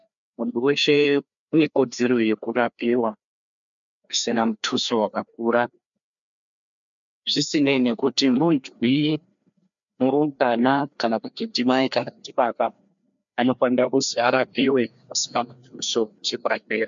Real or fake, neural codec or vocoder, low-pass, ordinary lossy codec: fake; codec, 16 kHz, 2 kbps, FreqCodec, larger model; 7.2 kHz; MP3, 64 kbps